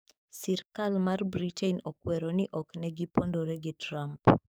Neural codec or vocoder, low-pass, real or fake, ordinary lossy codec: codec, 44.1 kHz, 7.8 kbps, DAC; none; fake; none